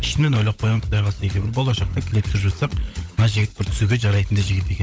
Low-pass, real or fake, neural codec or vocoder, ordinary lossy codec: none; fake; codec, 16 kHz, 16 kbps, FunCodec, trained on LibriTTS, 50 frames a second; none